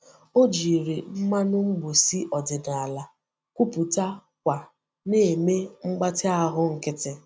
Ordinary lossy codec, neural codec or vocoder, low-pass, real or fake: none; none; none; real